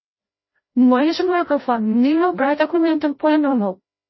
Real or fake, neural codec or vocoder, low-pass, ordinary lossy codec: fake; codec, 16 kHz, 0.5 kbps, FreqCodec, larger model; 7.2 kHz; MP3, 24 kbps